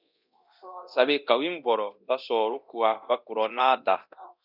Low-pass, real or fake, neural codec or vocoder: 5.4 kHz; fake; codec, 24 kHz, 0.9 kbps, DualCodec